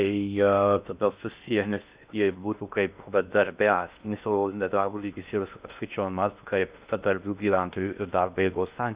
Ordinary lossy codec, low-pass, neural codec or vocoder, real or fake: Opus, 64 kbps; 3.6 kHz; codec, 16 kHz in and 24 kHz out, 0.6 kbps, FocalCodec, streaming, 2048 codes; fake